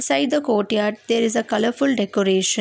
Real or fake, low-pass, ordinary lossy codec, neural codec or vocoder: real; none; none; none